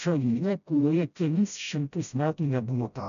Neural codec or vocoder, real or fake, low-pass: codec, 16 kHz, 0.5 kbps, FreqCodec, smaller model; fake; 7.2 kHz